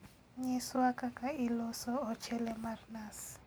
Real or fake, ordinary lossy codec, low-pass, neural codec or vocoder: real; none; none; none